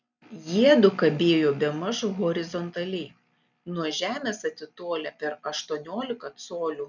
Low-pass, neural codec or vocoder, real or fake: 7.2 kHz; none; real